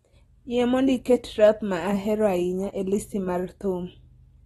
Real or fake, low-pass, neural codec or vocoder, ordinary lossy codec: real; 19.8 kHz; none; AAC, 32 kbps